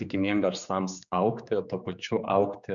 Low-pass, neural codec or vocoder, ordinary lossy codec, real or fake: 7.2 kHz; codec, 16 kHz, 4 kbps, X-Codec, HuBERT features, trained on general audio; Opus, 64 kbps; fake